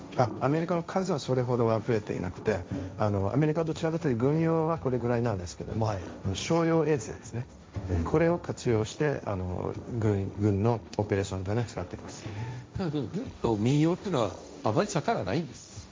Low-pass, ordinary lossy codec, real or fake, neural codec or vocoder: none; none; fake; codec, 16 kHz, 1.1 kbps, Voila-Tokenizer